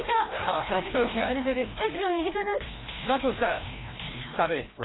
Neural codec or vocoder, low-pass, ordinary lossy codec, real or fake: codec, 16 kHz, 1 kbps, FreqCodec, larger model; 7.2 kHz; AAC, 16 kbps; fake